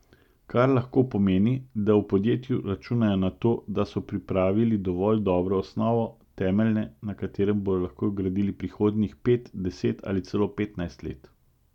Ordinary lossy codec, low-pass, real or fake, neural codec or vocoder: none; 19.8 kHz; real; none